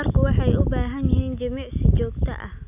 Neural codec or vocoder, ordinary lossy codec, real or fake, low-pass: none; none; real; 3.6 kHz